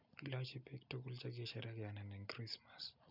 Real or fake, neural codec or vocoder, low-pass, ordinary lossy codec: real; none; 5.4 kHz; none